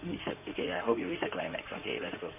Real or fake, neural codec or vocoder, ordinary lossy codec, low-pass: fake; codec, 16 kHz in and 24 kHz out, 2.2 kbps, FireRedTTS-2 codec; none; 3.6 kHz